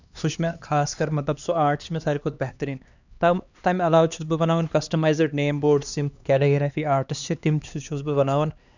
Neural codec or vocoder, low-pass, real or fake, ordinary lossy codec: codec, 16 kHz, 2 kbps, X-Codec, HuBERT features, trained on LibriSpeech; 7.2 kHz; fake; none